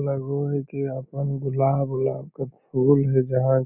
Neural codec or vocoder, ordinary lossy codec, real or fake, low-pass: none; none; real; 3.6 kHz